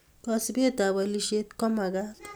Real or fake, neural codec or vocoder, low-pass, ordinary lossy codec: real; none; none; none